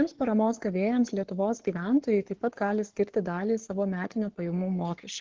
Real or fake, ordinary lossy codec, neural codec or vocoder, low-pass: fake; Opus, 16 kbps; codec, 44.1 kHz, 7.8 kbps, Pupu-Codec; 7.2 kHz